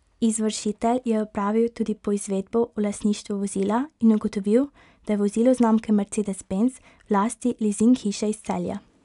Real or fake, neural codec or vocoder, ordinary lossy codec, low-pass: real; none; none; 10.8 kHz